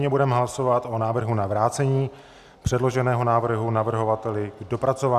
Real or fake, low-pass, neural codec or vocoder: real; 14.4 kHz; none